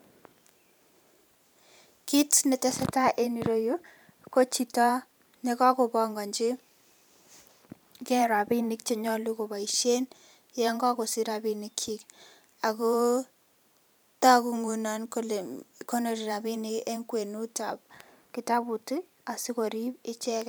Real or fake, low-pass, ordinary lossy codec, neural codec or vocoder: fake; none; none; vocoder, 44.1 kHz, 128 mel bands every 512 samples, BigVGAN v2